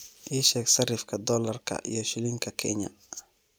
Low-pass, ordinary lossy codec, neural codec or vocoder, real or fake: none; none; none; real